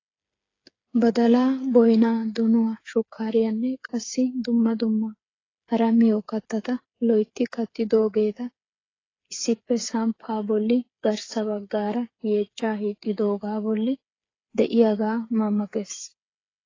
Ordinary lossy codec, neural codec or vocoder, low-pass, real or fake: AAC, 32 kbps; codec, 16 kHz, 8 kbps, FreqCodec, smaller model; 7.2 kHz; fake